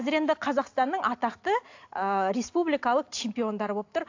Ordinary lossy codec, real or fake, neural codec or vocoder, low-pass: AAC, 48 kbps; real; none; 7.2 kHz